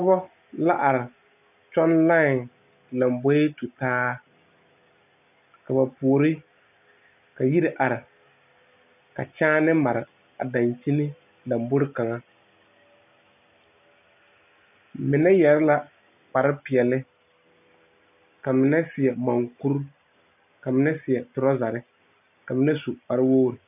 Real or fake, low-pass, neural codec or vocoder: real; 3.6 kHz; none